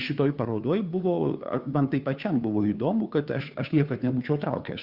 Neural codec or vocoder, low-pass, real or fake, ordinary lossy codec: codec, 16 kHz, 2 kbps, FunCodec, trained on Chinese and English, 25 frames a second; 5.4 kHz; fake; Opus, 64 kbps